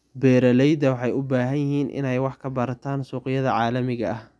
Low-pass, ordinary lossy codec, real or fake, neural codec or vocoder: none; none; real; none